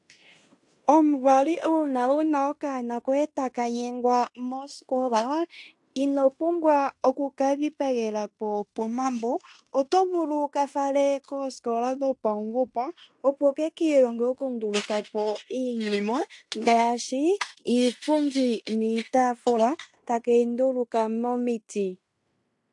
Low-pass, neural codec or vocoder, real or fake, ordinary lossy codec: 10.8 kHz; codec, 16 kHz in and 24 kHz out, 0.9 kbps, LongCat-Audio-Codec, fine tuned four codebook decoder; fake; AAC, 64 kbps